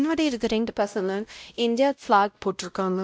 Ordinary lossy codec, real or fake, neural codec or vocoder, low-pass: none; fake; codec, 16 kHz, 0.5 kbps, X-Codec, WavLM features, trained on Multilingual LibriSpeech; none